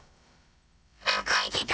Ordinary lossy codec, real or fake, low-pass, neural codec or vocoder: none; fake; none; codec, 16 kHz, about 1 kbps, DyCAST, with the encoder's durations